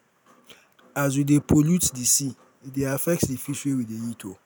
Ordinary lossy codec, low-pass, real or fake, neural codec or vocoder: none; none; real; none